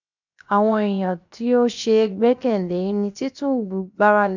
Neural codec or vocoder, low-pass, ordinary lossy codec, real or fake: codec, 16 kHz, 0.7 kbps, FocalCodec; 7.2 kHz; none; fake